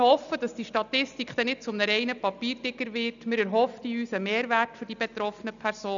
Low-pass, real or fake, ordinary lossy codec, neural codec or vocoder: 7.2 kHz; real; none; none